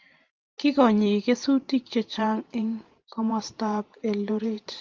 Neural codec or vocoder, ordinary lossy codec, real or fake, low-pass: vocoder, 44.1 kHz, 128 mel bands every 512 samples, BigVGAN v2; Opus, 32 kbps; fake; 7.2 kHz